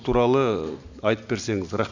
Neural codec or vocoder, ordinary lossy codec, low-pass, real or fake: none; none; 7.2 kHz; real